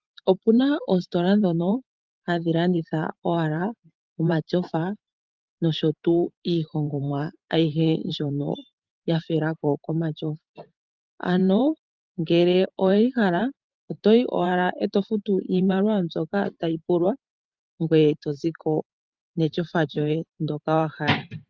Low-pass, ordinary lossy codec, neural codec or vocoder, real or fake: 7.2 kHz; Opus, 24 kbps; vocoder, 44.1 kHz, 128 mel bands every 512 samples, BigVGAN v2; fake